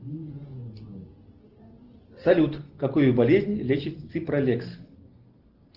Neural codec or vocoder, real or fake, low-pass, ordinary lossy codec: none; real; 5.4 kHz; Opus, 16 kbps